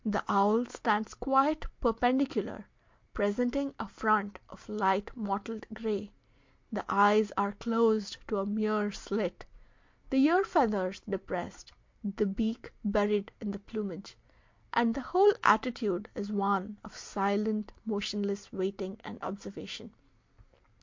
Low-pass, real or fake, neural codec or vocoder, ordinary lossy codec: 7.2 kHz; real; none; MP3, 48 kbps